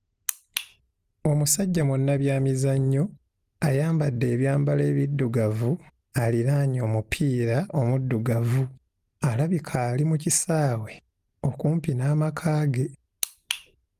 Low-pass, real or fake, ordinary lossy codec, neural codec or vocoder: 14.4 kHz; real; Opus, 24 kbps; none